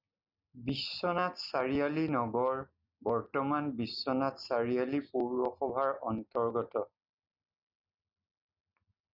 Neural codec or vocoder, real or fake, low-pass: none; real; 5.4 kHz